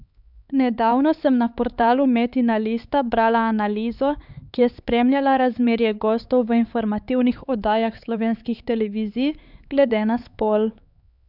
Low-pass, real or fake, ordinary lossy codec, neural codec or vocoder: 5.4 kHz; fake; none; codec, 16 kHz, 4 kbps, X-Codec, HuBERT features, trained on LibriSpeech